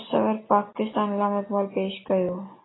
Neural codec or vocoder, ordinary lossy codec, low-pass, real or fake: none; AAC, 16 kbps; 7.2 kHz; real